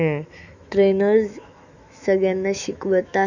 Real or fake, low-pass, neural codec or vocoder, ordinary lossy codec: fake; 7.2 kHz; autoencoder, 48 kHz, 128 numbers a frame, DAC-VAE, trained on Japanese speech; none